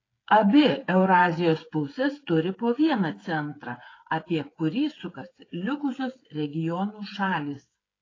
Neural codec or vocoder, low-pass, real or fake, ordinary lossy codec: codec, 16 kHz, 8 kbps, FreqCodec, smaller model; 7.2 kHz; fake; AAC, 32 kbps